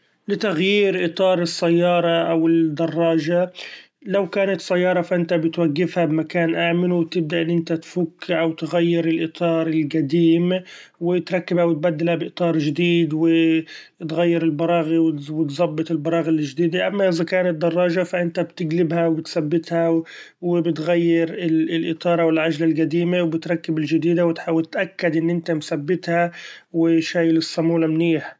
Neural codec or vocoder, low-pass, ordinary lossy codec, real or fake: none; none; none; real